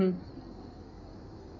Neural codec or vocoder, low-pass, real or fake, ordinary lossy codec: codec, 16 kHz in and 24 kHz out, 2.2 kbps, FireRedTTS-2 codec; 7.2 kHz; fake; none